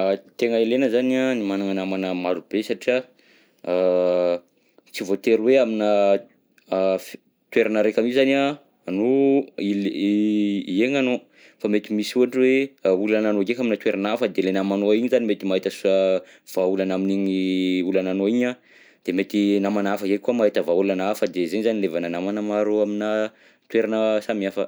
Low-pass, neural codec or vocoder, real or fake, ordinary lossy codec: none; none; real; none